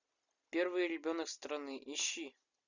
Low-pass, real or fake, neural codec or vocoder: 7.2 kHz; real; none